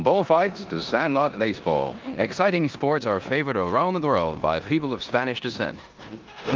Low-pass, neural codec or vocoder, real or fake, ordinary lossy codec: 7.2 kHz; codec, 16 kHz in and 24 kHz out, 0.9 kbps, LongCat-Audio-Codec, four codebook decoder; fake; Opus, 32 kbps